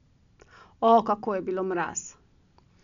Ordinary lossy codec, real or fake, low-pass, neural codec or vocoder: none; real; 7.2 kHz; none